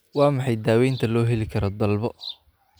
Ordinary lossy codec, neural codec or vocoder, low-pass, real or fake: none; none; none; real